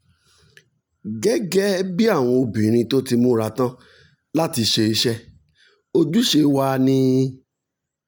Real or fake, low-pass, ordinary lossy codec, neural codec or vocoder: real; none; none; none